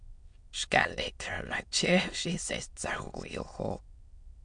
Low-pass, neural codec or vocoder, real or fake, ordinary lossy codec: 9.9 kHz; autoencoder, 22.05 kHz, a latent of 192 numbers a frame, VITS, trained on many speakers; fake; MP3, 64 kbps